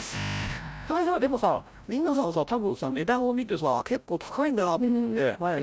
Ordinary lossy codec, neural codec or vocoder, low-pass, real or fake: none; codec, 16 kHz, 0.5 kbps, FreqCodec, larger model; none; fake